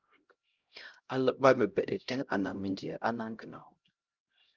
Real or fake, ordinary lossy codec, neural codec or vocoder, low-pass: fake; Opus, 32 kbps; codec, 16 kHz, 0.5 kbps, X-Codec, HuBERT features, trained on LibriSpeech; 7.2 kHz